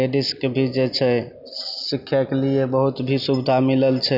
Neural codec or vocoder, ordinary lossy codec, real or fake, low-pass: none; none; real; 5.4 kHz